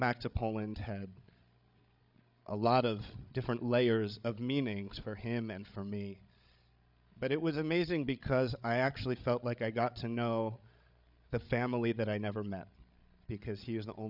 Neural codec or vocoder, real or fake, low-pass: codec, 16 kHz, 16 kbps, FreqCodec, larger model; fake; 5.4 kHz